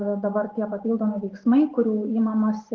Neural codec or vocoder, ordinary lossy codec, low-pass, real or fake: none; Opus, 16 kbps; 7.2 kHz; real